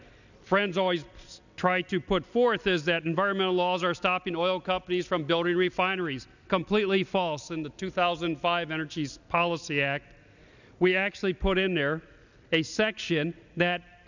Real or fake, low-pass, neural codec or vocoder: real; 7.2 kHz; none